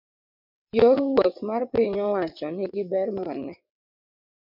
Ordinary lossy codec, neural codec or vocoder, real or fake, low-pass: MP3, 48 kbps; vocoder, 22.05 kHz, 80 mel bands, WaveNeXt; fake; 5.4 kHz